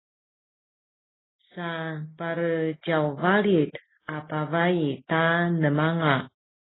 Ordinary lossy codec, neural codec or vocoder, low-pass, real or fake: AAC, 16 kbps; none; 7.2 kHz; real